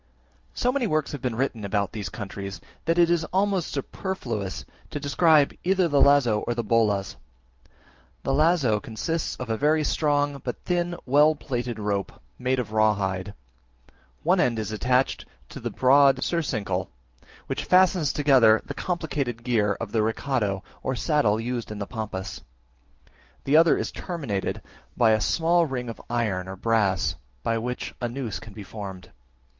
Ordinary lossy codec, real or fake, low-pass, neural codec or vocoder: Opus, 32 kbps; real; 7.2 kHz; none